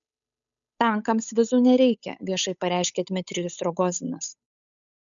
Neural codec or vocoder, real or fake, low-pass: codec, 16 kHz, 8 kbps, FunCodec, trained on Chinese and English, 25 frames a second; fake; 7.2 kHz